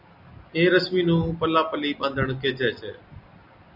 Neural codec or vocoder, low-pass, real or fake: none; 5.4 kHz; real